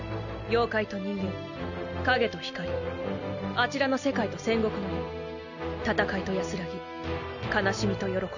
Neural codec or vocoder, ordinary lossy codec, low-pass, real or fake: none; none; 7.2 kHz; real